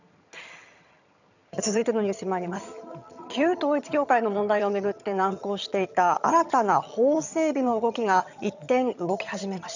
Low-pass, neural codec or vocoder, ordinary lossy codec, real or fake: 7.2 kHz; vocoder, 22.05 kHz, 80 mel bands, HiFi-GAN; none; fake